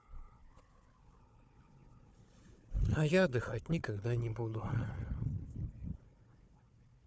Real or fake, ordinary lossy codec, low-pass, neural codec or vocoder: fake; none; none; codec, 16 kHz, 4 kbps, FunCodec, trained on Chinese and English, 50 frames a second